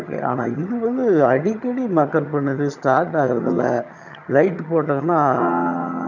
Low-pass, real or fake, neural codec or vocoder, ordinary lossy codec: 7.2 kHz; fake; vocoder, 22.05 kHz, 80 mel bands, HiFi-GAN; none